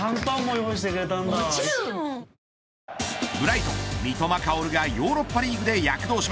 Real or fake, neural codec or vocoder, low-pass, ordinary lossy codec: real; none; none; none